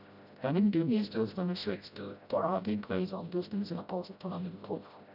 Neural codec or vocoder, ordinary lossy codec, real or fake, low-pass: codec, 16 kHz, 0.5 kbps, FreqCodec, smaller model; none; fake; 5.4 kHz